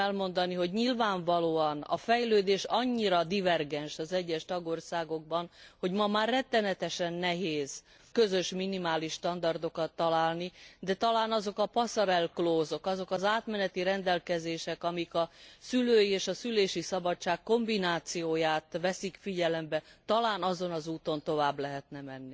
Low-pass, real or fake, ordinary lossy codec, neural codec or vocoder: none; real; none; none